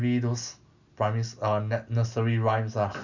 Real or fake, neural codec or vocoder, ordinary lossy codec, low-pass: real; none; none; 7.2 kHz